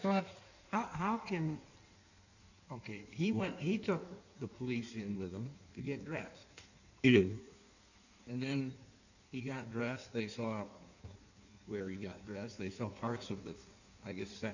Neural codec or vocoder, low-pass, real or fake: codec, 16 kHz in and 24 kHz out, 1.1 kbps, FireRedTTS-2 codec; 7.2 kHz; fake